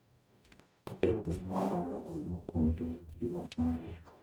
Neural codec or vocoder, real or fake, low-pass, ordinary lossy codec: codec, 44.1 kHz, 0.9 kbps, DAC; fake; none; none